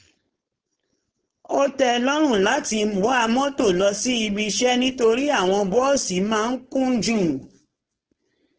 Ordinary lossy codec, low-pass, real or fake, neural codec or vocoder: Opus, 16 kbps; 7.2 kHz; fake; codec, 16 kHz, 4.8 kbps, FACodec